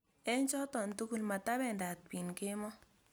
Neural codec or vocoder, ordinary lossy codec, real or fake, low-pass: none; none; real; none